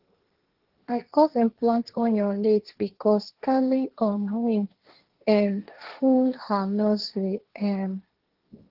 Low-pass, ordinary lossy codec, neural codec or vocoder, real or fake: 5.4 kHz; Opus, 16 kbps; codec, 16 kHz, 1.1 kbps, Voila-Tokenizer; fake